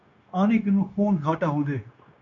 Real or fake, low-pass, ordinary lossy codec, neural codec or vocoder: fake; 7.2 kHz; MP3, 64 kbps; codec, 16 kHz, 0.9 kbps, LongCat-Audio-Codec